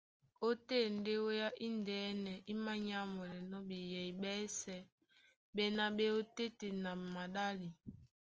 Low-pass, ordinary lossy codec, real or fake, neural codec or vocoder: 7.2 kHz; Opus, 24 kbps; real; none